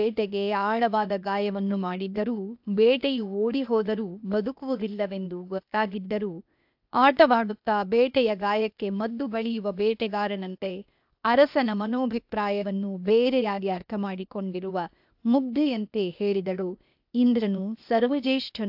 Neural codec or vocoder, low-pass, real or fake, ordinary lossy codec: codec, 16 kHz, 0.8 kbps, ZipCodec; 5.4 kHz; fake; AAC, 48 kbps